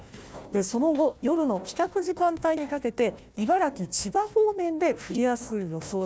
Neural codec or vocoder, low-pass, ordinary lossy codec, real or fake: codec, 16 kHz, 1 kbps, FunCodec, trained on Chinese and English, 50 frames a second; none; none; fake